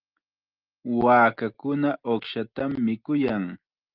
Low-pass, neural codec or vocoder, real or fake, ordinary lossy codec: 5.4 kHz; none; real; Opus, 32 kbps